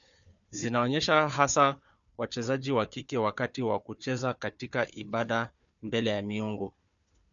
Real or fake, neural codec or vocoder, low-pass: fake; codec, 16 kHz, 4 kbps, FunCodec, trained on Chinese and English, 50 frames a second; 7.2 kHz